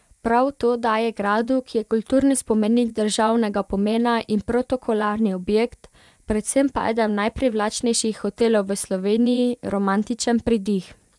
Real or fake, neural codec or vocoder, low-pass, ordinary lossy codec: fake; vocoder, 24 kHz, 100 mel bands, Vocos; none; none